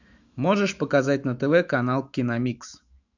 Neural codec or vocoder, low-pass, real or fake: autoencoder, 48 kHz, 128 numbers a frame, DAC-VAE, trained on Japanese speech; 7.2 kHz; fake